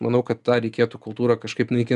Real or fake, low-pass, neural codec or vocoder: real; 10.8 kHz; none